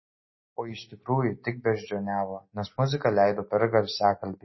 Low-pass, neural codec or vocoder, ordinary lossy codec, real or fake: 7.2 kHz; none; MP3, 24 kbps; real